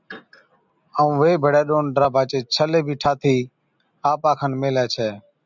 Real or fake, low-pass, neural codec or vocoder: real; 7.2 kHz; none